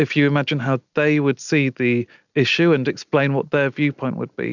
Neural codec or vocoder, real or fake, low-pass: none; real; 7.2 kHz